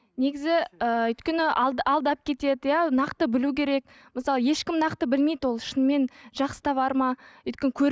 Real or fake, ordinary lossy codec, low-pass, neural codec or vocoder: real; none; none; none